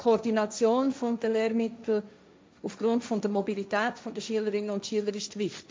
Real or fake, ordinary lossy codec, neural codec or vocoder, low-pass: fake; none; codec, 16 kHz, 1.1 kbps, Voila-Tokenizer; none